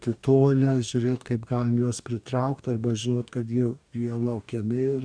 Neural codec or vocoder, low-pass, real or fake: codec, 44.1 kHz, 2.6 kbps, DAC; 9.9 kHz; fake